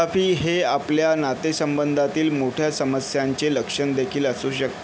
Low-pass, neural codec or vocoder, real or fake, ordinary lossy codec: none; none; real; none